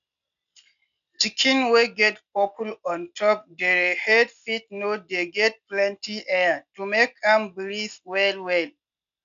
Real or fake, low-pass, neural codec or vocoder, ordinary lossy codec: real; 7.2 kHz; none; none